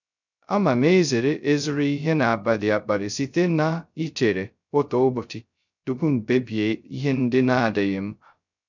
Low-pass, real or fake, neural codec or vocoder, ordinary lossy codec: 7.2 kHz; fake; codec, 16 kHz, 0.2 kbps, FocalCodec; none